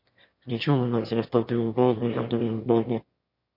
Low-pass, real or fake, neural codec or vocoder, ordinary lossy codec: 5.4 kHz; fake; autoencoder, 22.05 kHz, a latent of 192 numbers a frame, VITS, trained on one speaker; MP3, 32 kbps